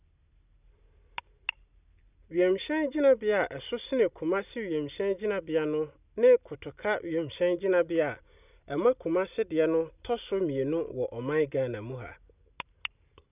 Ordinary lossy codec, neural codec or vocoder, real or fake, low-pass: none; none; real; 3.6 kHz